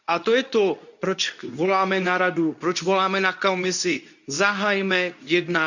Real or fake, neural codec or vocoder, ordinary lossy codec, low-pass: fake; codec, 24 kHz, 0.9 kbps, WavTokenizer, medium speech release version 2; none; 7.2 kHz